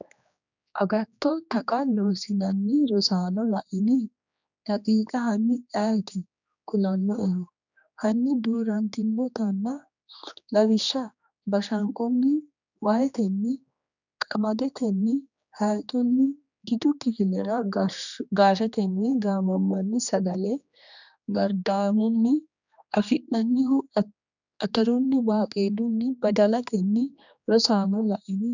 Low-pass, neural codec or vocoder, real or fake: 7.2 kHz; codec, 16 kHz, 2 kbps, X-Codec, HuBERT features, trained on general audio; fake